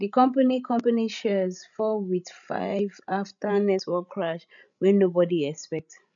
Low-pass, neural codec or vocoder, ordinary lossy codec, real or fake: 7.2 kHz; codec, 16 kHz, 16 kbps, FreqCodec, larger model; none; fake